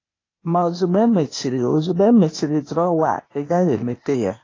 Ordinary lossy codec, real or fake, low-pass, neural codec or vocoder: AAC, 32 kbps; fake; 7.2 kHz; codec, 16 kHz, 0.8 kbps, ZipCodec